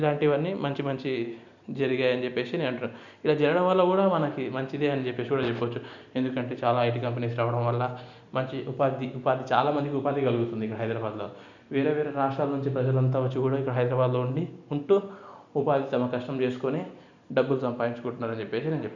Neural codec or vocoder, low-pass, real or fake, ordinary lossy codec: none; 7.2 kHz; real; none